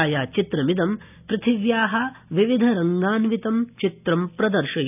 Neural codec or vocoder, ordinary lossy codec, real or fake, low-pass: none; none; real; 3.6 kHz